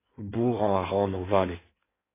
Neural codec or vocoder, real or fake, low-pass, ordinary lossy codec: codec, 16 kHz in and 24 kHz out, 1.1 kbps, FireRedTTS-2 codec; fake; 3.6 kHz; AAC, 16 kbps